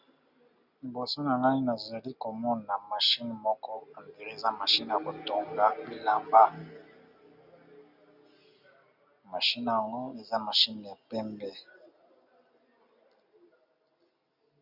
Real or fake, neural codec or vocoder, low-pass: real; none; 5.4 kHz